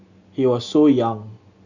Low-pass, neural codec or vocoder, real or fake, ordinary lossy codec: 7.2 kHz; none; real; none